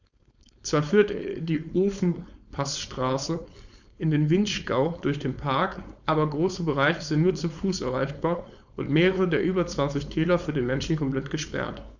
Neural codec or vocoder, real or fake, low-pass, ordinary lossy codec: codec, 16 kHz, 4.8 kbps, FACodec; fake; 7.2 kHz; none